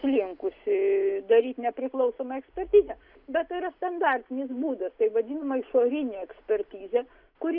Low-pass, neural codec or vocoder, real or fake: 5.4 kHz; none; real